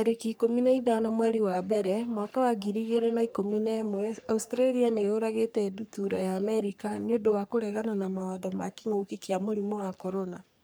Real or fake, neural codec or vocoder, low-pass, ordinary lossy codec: fake; codec, 44.1 kHz, 3.4 kbps, Pupu-Codec; none; none